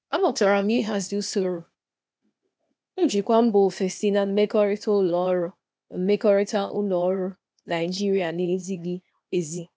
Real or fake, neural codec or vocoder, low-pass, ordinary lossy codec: fake; codec, 16 kHz, 0.8 kbps, ZipCodec; none; none